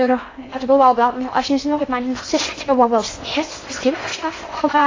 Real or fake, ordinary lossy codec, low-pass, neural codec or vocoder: fake; AAC, 32 kbps; 7.2 kHz; codec, 16 kHz in and 24 kHz out, 0.6 kbps, FocalCodec, streaming, 2048 codes